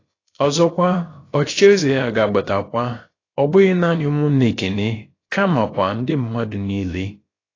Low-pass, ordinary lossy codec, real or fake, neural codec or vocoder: 7.2 kHz; AAC, 32 kbps; fake; codec, 16 kHz, about 1 kbps, DyCAST, with the encoder's durations